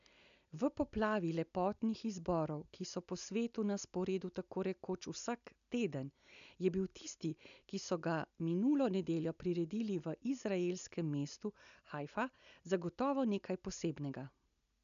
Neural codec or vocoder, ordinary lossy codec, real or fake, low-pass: none; none; real; 7.2 kHz